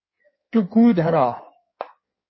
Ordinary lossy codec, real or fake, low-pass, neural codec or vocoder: MP3, 24 kbps; fake; 7.2 kHz; codec, 16 kHz in and 24 kHz out, 1.1 kbps, FireRedTTS-2 codec